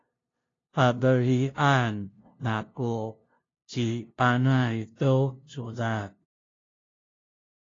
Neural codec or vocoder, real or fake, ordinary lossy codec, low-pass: codec, 16 kHz, 0.5 kbps, FunCodec, trained on LibriTTS, 25 frames a second; fake; AAC, 32 kbps; 7.2 kHz